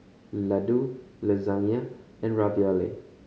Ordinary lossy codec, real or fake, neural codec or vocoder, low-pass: none; real; none; none